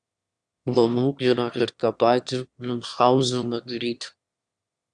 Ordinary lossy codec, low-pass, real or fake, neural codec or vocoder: Opus, 64 kbps; 9.9 kHz; fake; autoencoder, 22.05 kHz, a latent of 192 numbers a frame, VITS, trained on one speaker